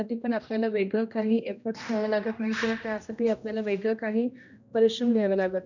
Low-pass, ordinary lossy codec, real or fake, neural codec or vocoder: 7.2 kHz; none; fake; codec, 16 kHz, 1 kbps, X-Codec, HuBERT features, trained on general audio